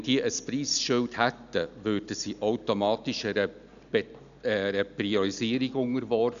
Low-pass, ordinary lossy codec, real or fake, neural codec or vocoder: 7.2 kHz; none; real; none